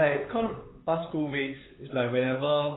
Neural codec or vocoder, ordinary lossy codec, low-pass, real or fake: codec, 16 kHz, 4 kbps, X-Codec, HuBERT features, trained on LibriSpeech; AAC, 16 kbps; 7.2 kHz; fake